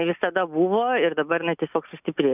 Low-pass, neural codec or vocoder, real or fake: 3.6 kHz; none; real